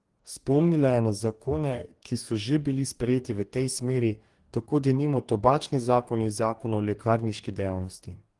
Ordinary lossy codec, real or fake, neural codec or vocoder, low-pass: Opus, 24 kbps; fake; codec, 44.1 kHz, 2.6 kbps, DAC; 10.8 kHz